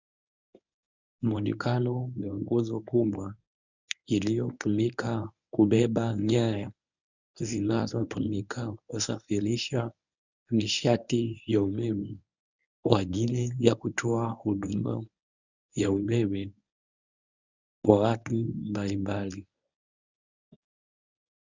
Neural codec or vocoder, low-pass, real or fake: codec, 24 kHz, 0.9 kbps, WavTokenizer, medium speech release version 1; 7.2 kHz; fake